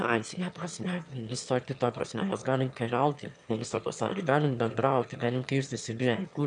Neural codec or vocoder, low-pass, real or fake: autoencoder, 22.05 kHz, a latent of 192 numbers a frame, VITS, trained on one speaker; 9.9 kHz; fake